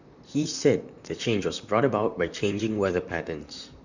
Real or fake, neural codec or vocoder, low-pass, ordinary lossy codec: fake; vocoder, 44.1 kHz, 128 mel bands, Pupu-Vocoder; 7.2 kHz; none